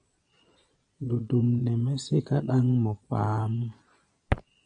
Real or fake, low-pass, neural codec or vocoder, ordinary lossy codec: fake; 9.9 kHz; vocoder, 22.05 kHz, 80 mel bands, Vocos; MP3, 96 kbps